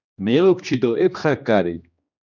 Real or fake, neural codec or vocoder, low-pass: fake; codec, 16 kHz, 2 kbps, X-Codec, HuBERT features, trained on general audio; 7.2 kHz